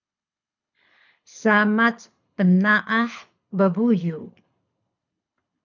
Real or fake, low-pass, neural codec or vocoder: fake; 7.2 kHz; codec, 24 kHz, 6 kbps, HILCodec